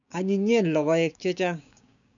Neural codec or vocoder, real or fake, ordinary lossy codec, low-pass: codec, 16 kHz, 6 kbps, DAC; fake; none; 7.2 kHz